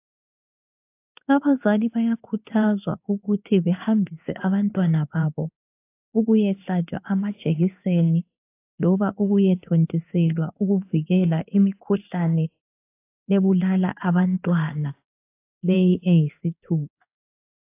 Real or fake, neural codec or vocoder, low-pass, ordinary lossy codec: fake; codec, 16 kHz in and 24 kHz out, 1 kbps, XY-Tokenizer; 3.6 kHz; AAC, 24 kbps